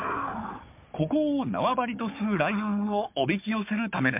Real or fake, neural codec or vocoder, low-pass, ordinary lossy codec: fake; codec, 16 kHz, 4 kbps, FunCodec, trained on Chinese and English, 50 frames a second; 3.6 kHz; MP3, 32 kbps